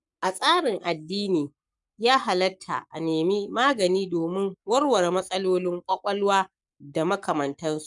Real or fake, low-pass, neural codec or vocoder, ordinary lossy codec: fake; 10.8 kHz; codec, 44.1 kHz, 7.8 kbps, Pupu-Codec; none